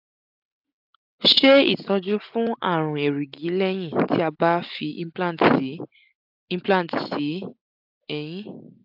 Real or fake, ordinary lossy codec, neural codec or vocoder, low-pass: real; AAC, 48 kbps; none; 5.4 kHz